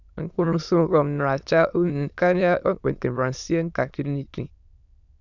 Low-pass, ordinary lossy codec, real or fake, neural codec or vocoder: 7.2 kHz; none; fake; autoencoder, 22.05 kHz, a latent of 192 numbers a frame, VITS, trained on many speakers